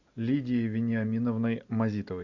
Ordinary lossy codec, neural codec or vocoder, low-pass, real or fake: MP3, 64 kbps; none; 7.2 kHz; real